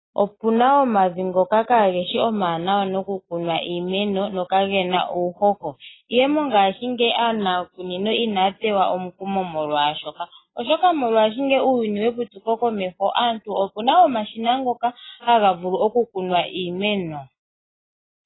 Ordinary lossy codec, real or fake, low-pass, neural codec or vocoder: AAC, 16 kbps; real; 7.2 kHz; none